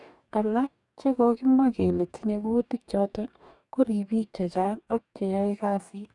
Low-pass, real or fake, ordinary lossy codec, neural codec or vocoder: 10.8 kHz; fake; none; codec, 44.1 kHz, 2.6 kbps, DAC